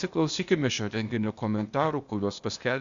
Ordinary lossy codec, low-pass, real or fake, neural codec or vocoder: Opus, 64 kbps; 7.2 kHz; fake; codec, 16 kHz, 0.8 kbps, ZipCodec